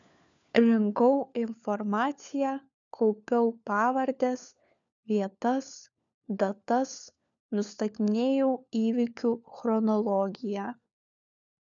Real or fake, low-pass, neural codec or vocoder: fake; 7.2 kHz; codec, 16 kHz, 4 kbps, FunCodec, trained on LibriTTS, 50 frames a second